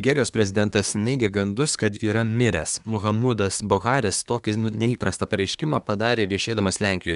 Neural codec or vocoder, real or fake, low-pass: codec, 24 kHz, 1 kbps, SNAC; fake; 10.8 kHz